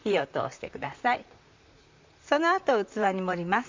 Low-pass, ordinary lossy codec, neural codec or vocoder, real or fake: 7.2 kHz; MP3, 64 kbps; vocoder, 44.1 kHz, 128 mel bands, Pupu-Vocoder; fake